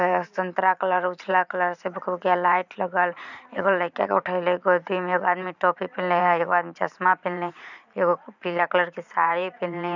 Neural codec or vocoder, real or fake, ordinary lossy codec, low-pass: vocoder, 44.1 kHz, 128 mel bands every 512 samples, BigVGAN v2; fake; none; 7.2 kHz